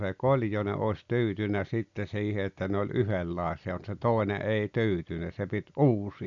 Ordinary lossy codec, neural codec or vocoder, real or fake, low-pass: none; none; real; 7.2 kHz